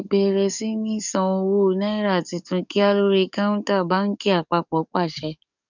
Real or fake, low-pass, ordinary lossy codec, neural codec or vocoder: fake; 7.2 kHz; none; codec, 44.1 kHz, 7.8 kbps, DAC